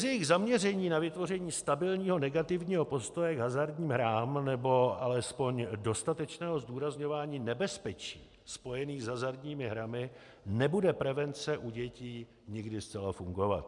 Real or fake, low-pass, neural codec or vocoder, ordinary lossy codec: real; 10.8 kHz; none; MP3, 96 kbps